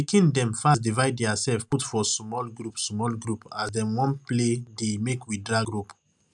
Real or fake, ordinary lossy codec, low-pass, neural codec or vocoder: real; none; 10.8 kHz; none